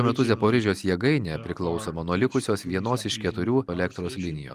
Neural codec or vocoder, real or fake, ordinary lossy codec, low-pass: none; real; Opus, 32 kbps; 14.4 kHz